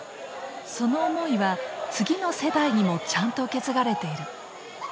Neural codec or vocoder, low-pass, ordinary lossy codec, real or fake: none; none; none; real